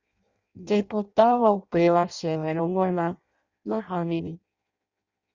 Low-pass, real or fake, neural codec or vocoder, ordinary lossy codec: 7.2 kHz; fake; codec, 16 kHz in and 24 kHz out, 0.6 kbps, FireRedTTS-2 codec; Opus, 64 kbps